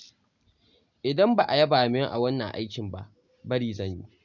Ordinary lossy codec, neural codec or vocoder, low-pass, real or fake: none; none; 7.2 kHz; real